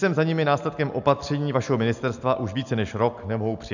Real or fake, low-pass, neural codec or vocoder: real; 7.2 kHz; none